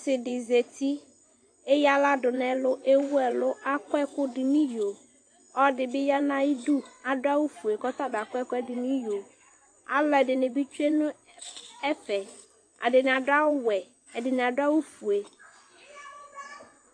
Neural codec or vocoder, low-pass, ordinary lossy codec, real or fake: vocoder, 24 kHz, 100 mel bands, Vocos; 9.9 kHz; AAC, 48 kbps; fake